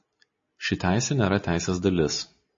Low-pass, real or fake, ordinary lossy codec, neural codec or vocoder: 7.2 kHz; real; MP3, 32 kbps; none